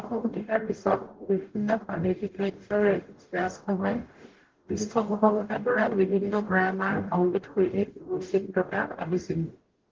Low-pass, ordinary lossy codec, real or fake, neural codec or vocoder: 7.2 kHz; Opus, 16 kbps; fake; codec, 44.1 kHz, 0.9 kbps, DAC